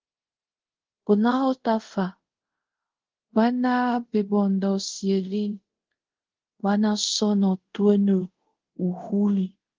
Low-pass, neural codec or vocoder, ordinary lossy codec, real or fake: 7.2 kHz; codec, 24 kHz, 0.5 kbps, DualCodec; Opus, 16 kbps; fake